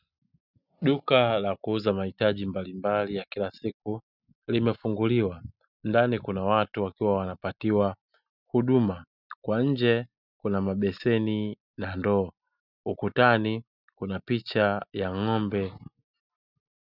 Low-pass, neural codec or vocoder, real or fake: 5.4 kHz; none; real